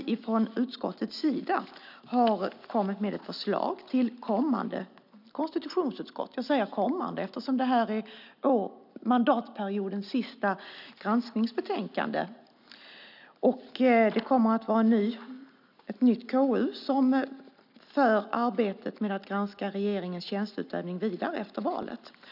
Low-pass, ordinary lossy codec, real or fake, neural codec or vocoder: 5.4 kHz; none; real; none